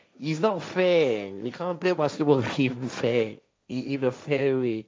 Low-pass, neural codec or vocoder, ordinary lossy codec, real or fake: none; codec, 16 kHz, 1.1 kbps, Voila-Tokenizer; none; fake